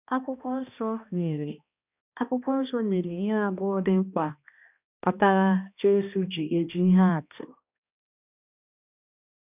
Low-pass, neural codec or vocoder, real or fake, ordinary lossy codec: 3.6 kHz; codec, 16 kHz, 1 kbps, X-Codec, HuBERT features, trained on balanced general audio; fake; none